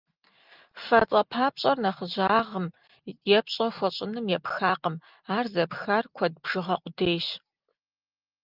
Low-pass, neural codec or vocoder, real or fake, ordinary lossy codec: 5.4 kHz; none; real; Opus, 24 kbps